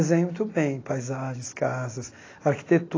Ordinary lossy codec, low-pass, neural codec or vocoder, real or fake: AAC, 32 kbps; 7.2 kHz; none; real